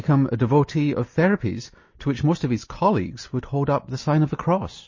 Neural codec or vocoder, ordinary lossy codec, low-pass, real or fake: none; MP3, 32 kbps; 7.2 kHz; real